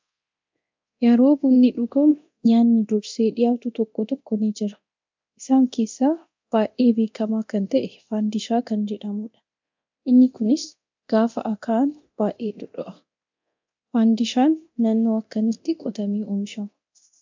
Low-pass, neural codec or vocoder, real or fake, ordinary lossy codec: 7.2 kHz; codec, 24 kHz, 0.9 kbps, DualCodec; fake; MP3, 64 kbps